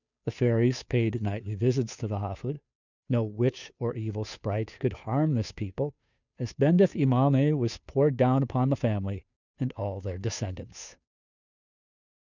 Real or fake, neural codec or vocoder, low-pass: fake; codec, 16 kHz, 2 kbps, FunCodec, trained on Chinese and English, 25 frames a second; 7.2 kHz